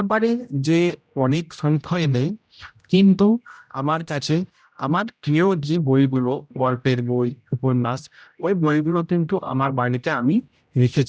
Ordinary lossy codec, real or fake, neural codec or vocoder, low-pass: none; fake; codec, 16 kHz, 0.5 kbps, X-Codec, HuBERT features, trained on general audio; none